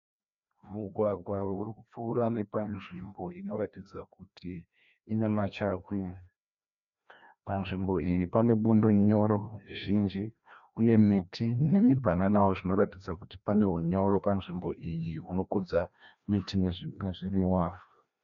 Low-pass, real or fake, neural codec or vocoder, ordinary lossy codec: 7.2 kHz; fake; codec, 16 kHz, 1 kbps, FreqCodec, larger model; MP3, 96 kbps